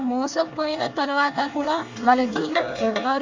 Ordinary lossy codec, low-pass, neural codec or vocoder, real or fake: MP3, 64 kbps; 7.2 kHz; codec, 24 kHz, 1 kbps, SNAC; fake